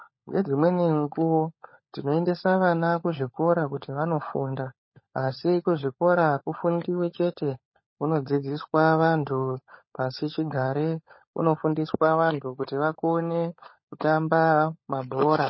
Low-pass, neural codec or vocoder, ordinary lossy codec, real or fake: 7.2 kHz; codec, 16 kHz, 16 kbps, FunCodec, trained on LibriTTS, 50 frames a second; MP3, 24 kbps; fake